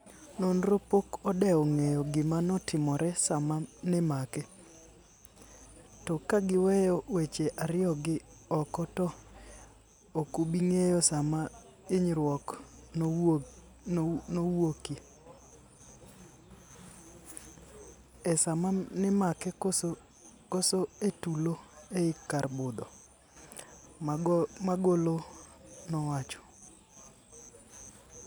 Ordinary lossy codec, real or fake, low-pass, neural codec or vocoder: none; real; none; none